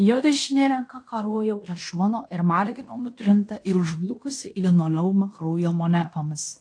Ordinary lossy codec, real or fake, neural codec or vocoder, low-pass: AAC, 48 kbps; fake; codec, 16 kHz in and 24 kHz out, 0.9 kbps, LongCat-Audio-Codec, fine tuned four codebook decoder; 9.9 kHz